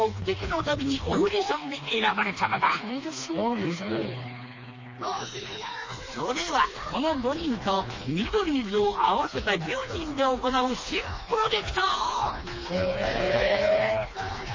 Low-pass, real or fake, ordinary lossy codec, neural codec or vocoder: 7.2 kHz; fake; MP3, 32 kbps; codec, 16 kHz, 2 kbps, FreqCodec, smaller model